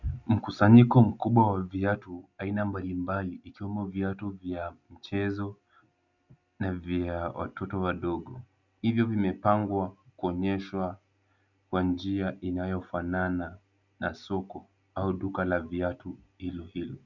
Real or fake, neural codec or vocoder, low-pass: real; none; 7.2 kHz